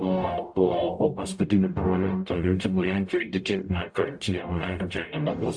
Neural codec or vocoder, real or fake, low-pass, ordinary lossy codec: codec, 44.1 kHz, 0.9 kbps, DAC; fake; 9.9 kHz; AAC, 64 kbps